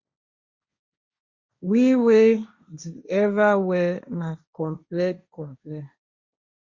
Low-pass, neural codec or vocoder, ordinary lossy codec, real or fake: 7.2 kHz; codec, 16 kHz, 1.1 kbps, Voila-Tokenizer; Opus, 64 kbps; fake